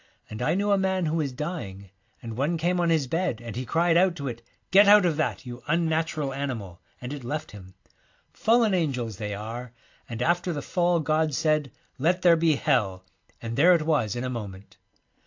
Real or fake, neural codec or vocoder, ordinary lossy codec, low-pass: real; none; AAC, 48 kbps; 7.2 kHz